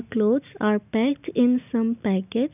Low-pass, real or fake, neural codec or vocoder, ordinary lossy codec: 3.6 kHz; real; none; none